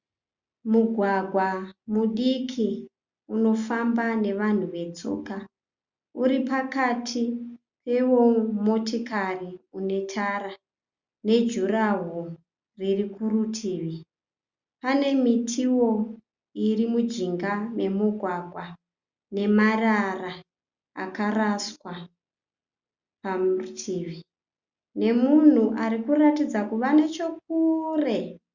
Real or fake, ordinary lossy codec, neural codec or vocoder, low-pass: real; Opus, 64 kbps; none; 7.2 kHz